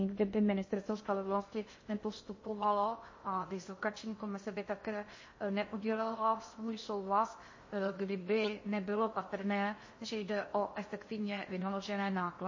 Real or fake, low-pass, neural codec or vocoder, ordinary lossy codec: fake; 7.2 kHz; codec, 16 kHz in and 24 kHz out, 0.6 kbps, FocalCodec, streaming, 2048 codes; MP3, 32 kbps